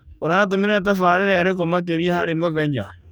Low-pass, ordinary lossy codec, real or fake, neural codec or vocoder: none; none; fake; codec, 44.1 kHz, 2.6 kbps, DAC